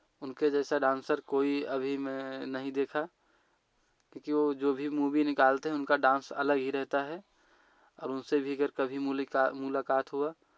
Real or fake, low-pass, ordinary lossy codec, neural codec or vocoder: real; none; none; none